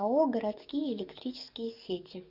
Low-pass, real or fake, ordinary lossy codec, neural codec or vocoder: 5.4 kHz; fake; Opus, 64 kbps; codec, 44.1 kHz, 7.8 kbps, DAC